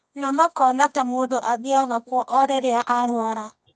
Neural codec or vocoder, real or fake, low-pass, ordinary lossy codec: codec, 24 kHz, 0.9 kbps, WavTokenizer, medium music audio release; fake; none; none